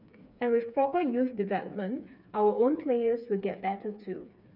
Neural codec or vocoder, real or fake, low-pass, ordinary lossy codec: codec, 16 kHz, 4 kbps, FreqCodec, smaller model; fake; 5.4 kHz; none